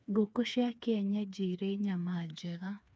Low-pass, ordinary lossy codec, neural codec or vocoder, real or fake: none; none; codec, 16 kHz, 4 kbps, FreqCodec, smaller model; fake